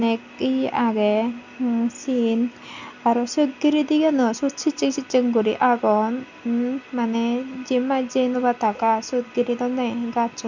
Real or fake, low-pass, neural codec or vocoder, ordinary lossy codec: real; 7.2 kHz; none; none